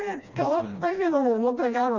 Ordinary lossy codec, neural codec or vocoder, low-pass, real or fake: Opus, 64 kbps; codec, 16 kHz, 1 kbps, FreqCodec, smaller model; 7.2 kHz; fake